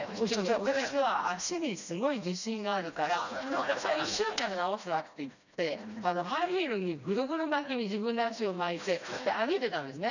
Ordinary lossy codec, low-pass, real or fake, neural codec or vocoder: none; 7.2 kHz; fake; codec, 16 kHz, 1 kbps, FreqCodec, smaller model